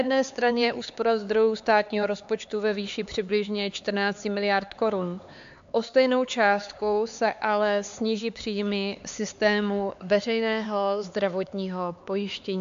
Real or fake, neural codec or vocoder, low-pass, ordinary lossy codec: fake; codec, 16 kHz, 4 kbps, X-Codec, HuBERT features, trained on LibriSpeech; 7.2 kHz; AAC, 64 kbps